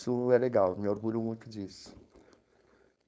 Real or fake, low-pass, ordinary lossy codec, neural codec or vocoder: fake; none; none; codec, 16 kHz, 4.8 kbps, FACodec